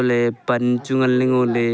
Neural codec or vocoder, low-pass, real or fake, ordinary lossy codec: none; none; real; none